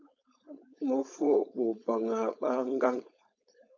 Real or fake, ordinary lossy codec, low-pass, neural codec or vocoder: fake; AAC, 48 kbps; 7.2 kHz; codec, 16 kHz, 4.8 kbps, FACodec